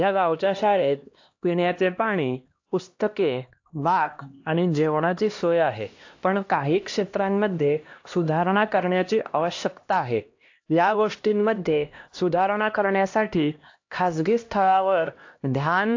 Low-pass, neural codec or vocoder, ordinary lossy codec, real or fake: 7.2 kHz; codec, 16 kHz, 1 kbps, X-Codec, HuBERT features, trained on LibriSpeech; AAC, 48 kbps; fake